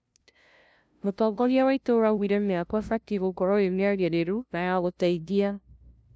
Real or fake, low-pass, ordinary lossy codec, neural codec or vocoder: fake; none; none; codec, 16 kHz, 0.5 kbps, FunCodec, trained on LibriTTS, 25 frames a second